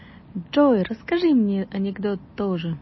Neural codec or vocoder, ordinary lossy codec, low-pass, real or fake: none; MP3, 24 kbps; 7.2 kHz; real